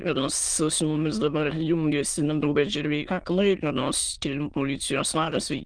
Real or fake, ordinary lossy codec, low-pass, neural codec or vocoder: fake; Opus, 24 kbps; 9.9 kHz; autoencoder, 22.05 kHz, a latent of 192 numbers a frame, VITS, trained on many speakers